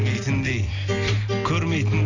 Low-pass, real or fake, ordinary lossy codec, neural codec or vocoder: 7.2 kHz; real; none; none